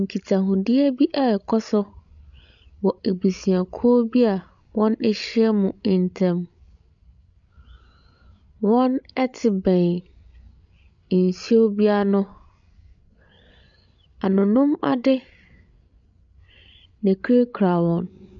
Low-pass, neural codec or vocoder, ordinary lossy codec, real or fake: 7.2 kHz; codec, 16 kHz, 16 kbps, FreqCodec, larger model; MP3, 96 kbps; fake